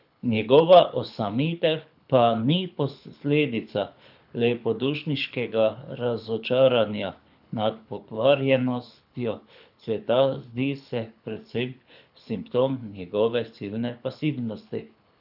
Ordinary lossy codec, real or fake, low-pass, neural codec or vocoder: none; fake; 5.4 kHz; codec, 24 kHz, 6 kbps, HILCodec